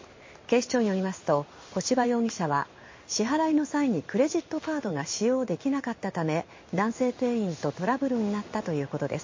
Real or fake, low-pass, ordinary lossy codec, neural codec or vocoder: fake; 7.2 kHz; MP3, 32 kbps; codec, 16 kHz in and 24 kHz out, 1 kbps, XY-Tokenizer